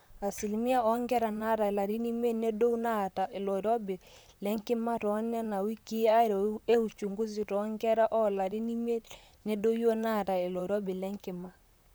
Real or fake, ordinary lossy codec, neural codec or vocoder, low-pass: fake; none; vocoder, 44.1 kHz, 128 mel bands, Pupu-Vocoder; none